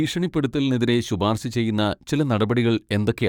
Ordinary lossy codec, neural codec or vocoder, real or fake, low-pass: none; codec, 44.1 kHz, 7.8 kbps, DAC; fake; 19.8 kHz